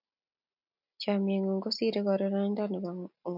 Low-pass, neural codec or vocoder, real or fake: 5.4 kHz; none; real